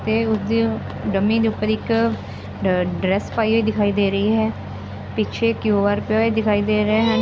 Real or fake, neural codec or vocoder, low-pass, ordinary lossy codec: real; none; none; none